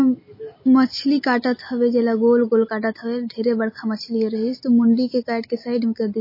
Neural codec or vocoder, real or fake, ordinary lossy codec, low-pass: none; real; MP3, 24 kbps; 5.4 kHz